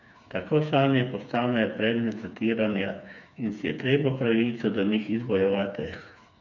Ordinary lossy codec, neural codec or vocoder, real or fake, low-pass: none; codec, 16 kHz, 4 kbps, FreqCodec, smaller model; fake; 7.2 kHz